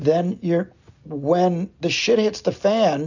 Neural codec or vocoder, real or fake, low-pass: none; real; 7.2 kHz